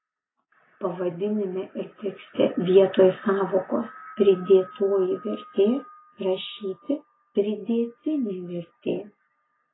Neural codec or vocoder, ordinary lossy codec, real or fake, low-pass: none; AAC, 16 kbps; real; 7.2 kHz